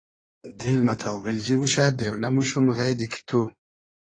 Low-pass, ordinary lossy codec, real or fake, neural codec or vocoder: 9.9 kHz; AAC, 32 kbps; fake; codec, 16 kHz in and 24 kHz out, 1.1 kbps, FireRedTTS-2 codec